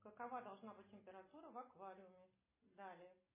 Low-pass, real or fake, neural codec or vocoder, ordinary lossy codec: 3.6 kHz; real; none; MP3, 16 kbps